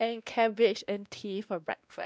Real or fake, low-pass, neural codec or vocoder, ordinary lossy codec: fake; none; codec, 16 kHz, 1 kbps, X-Codec, WavLM features, trained on Multilingual LibriSpeech; none